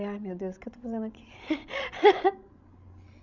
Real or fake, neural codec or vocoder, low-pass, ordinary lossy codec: fake; codec, 16 kHz, 16 kbps, FreqCodec, larger model; 7.2 kHz; none